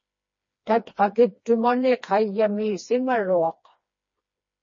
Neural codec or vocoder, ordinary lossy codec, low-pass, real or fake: codec, 16 kHz, 2 kbps, FreqCodec, smaller model; MP3, 32 kbps; 7.2 kHz; fake